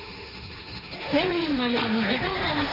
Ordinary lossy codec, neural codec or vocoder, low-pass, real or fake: none; codec, 16 kHz, 1.1 kbps, Voila-Tokenizer; 5.4 kHz; fake